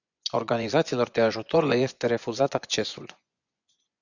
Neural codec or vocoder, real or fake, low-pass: vocoder, 24 kHz, 100 mel bands, Vocos; fake; 7.2 kHz